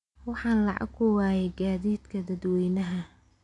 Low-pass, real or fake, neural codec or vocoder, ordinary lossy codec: 10.8 kHz; real; none; none